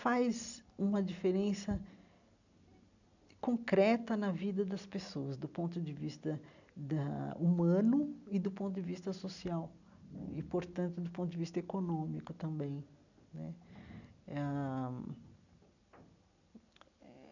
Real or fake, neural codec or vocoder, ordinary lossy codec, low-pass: real; none; none; 7.2 kHz